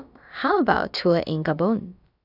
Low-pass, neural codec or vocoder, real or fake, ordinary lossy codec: 5.4 kHz; codec, 16 kHz, about 1 kbps, DyCAST, with the encoder's durations; fake; none